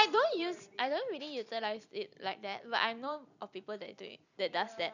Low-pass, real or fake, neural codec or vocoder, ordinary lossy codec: 7.2 kHz; fake; vocoder, 44.1 kHz, 128 mel bands every 256 samples, BigVGAN v2; none